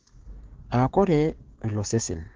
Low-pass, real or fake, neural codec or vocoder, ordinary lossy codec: 7.2 kHz; fake; codec, 16 kHz, 6 kbps, DAC; Opus, 16 kbps